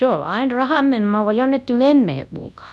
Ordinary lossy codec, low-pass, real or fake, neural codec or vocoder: none; none; fake; codec, 24 kHz, 0.9 kbps, WavTokenizer, large speech release